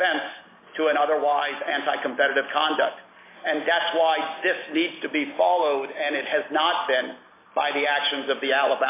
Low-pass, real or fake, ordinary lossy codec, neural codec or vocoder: 3.6 kHz; real; AAC, 24 kbps; none